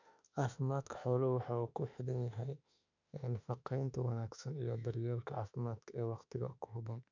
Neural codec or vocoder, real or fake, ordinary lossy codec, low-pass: autoencoder, 48 kHz, 32 numbers a frame, DAC-VAE, trained on Japanese speech; fake; none; 7.2 kHz